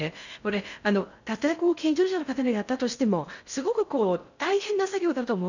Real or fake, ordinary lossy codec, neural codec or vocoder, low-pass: fake; none; codec, 16 kHz in and 24 kHz out, 0.6 kbps, FocalCodec, streaming, 2048 codes; 7.2 kHz